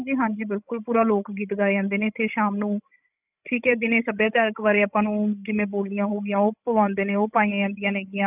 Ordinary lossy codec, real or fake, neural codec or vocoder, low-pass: none; fake; codec, 16 kHz, 16 kbps, FreqCodec, larger model; 3.6 kHz